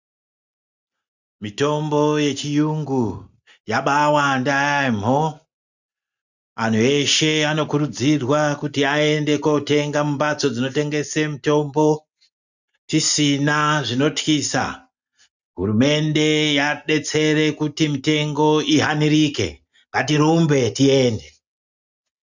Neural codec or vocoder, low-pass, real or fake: none; 7.2 kHz; real